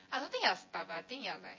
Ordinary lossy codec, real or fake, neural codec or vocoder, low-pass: MP3, 32 kbps; fake; vocoder, 24 kHz, 100 mel bands, Vocos; 7.2 kHz